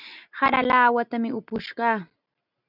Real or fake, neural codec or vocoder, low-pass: real; none; 5.4 kHz